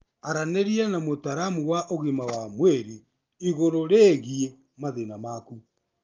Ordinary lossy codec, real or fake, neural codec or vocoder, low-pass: Opus, 32 kbps; real; none; 7.2 kHz